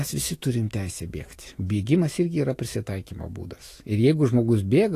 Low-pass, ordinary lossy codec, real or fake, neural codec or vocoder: 14.4 kHz; AAC, 48 kbps; fake; autoencoder, 48 kHz, 128 numbers a frame, DAC-VAE, trained on Japanese speech